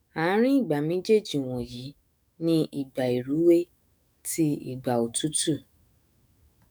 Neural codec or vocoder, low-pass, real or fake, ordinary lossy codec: autoencoder, 48 kHz, 128 numbers a frame, DAC-VAE, trained on Japanese speech; none; fake; none